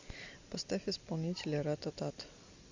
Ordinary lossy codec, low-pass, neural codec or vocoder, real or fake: AAC, 48 kbps; 7.2 kHz; none; real